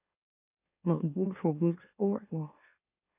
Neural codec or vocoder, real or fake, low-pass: autoencoder, 44.1 kHz, a latent of 192 numbers a frame, MeloTTS; fake; 3.6 kHz